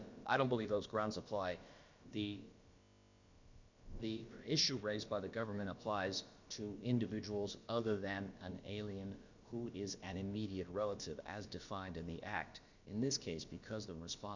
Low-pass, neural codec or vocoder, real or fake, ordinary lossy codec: 7.2 kHz; codec, 16 kHz, about 1 kbps, DyCAST, with the encoder's durations; fake; Opus, 64 kbps